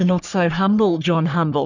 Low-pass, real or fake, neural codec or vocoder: 7.2 kHz; fake; codec, 44.1 kHz, 3.4 kbps, Pupu-Codec